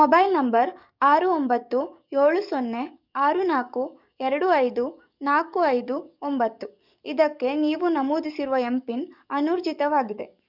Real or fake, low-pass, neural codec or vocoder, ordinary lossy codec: fake; 5.4 kHz; codec, 44.1 kHz, 7.8 kbps, DAC; none